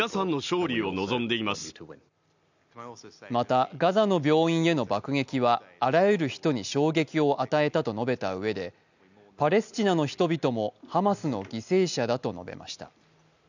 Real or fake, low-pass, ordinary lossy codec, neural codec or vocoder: real; 7.2 kHz; none; none